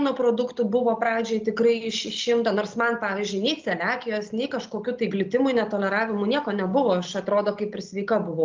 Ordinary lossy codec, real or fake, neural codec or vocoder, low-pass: Opus, 32 kbps; real; none; 7.2 kHz